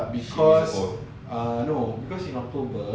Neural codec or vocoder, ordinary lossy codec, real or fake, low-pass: none; none; real; none